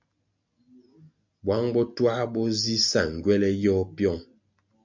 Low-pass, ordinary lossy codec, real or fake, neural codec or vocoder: 7.2 kHz; MP3, 64 kbps; real; none